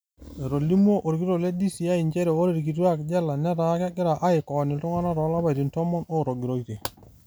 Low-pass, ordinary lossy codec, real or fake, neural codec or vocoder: none; none; real; none